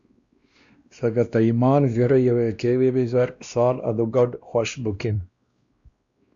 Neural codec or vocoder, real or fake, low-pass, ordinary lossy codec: codec, 16 kHz, 1 kbps, X-Codec, WavLM features, trained on Multilingual LibriSpeech; fake; 7.2 kHz; Opus, 64 kbps